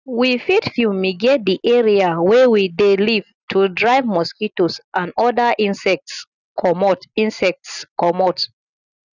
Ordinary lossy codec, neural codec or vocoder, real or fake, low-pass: none; none; real; 7.2 kHz